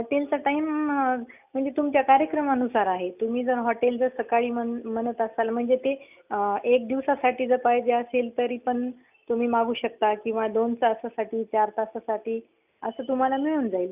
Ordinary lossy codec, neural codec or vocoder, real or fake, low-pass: none; none; real; 3.6 kHz